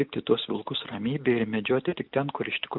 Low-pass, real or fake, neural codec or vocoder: 5.4 kHz; real; none